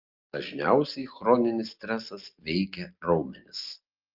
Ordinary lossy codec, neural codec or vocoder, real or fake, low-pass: Opus, 24 kbps; none; real; 5.4 kHz